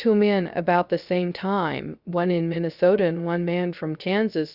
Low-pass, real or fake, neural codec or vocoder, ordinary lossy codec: 5.4 kHz; fake; codec, 16 kHz, 0.3 kbps, FocalCodec; Opus, 64 kbps